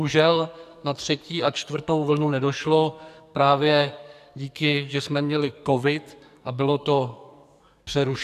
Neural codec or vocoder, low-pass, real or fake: codec, 44.1 kHz, 2.6 kbps, SNAC; 14.4 kHz; fake